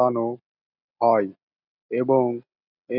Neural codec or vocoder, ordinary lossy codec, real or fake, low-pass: none; none; real; 5.4 kHz